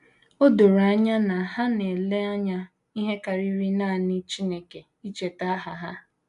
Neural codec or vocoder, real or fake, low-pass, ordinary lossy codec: none; real; 10.8 kHz; none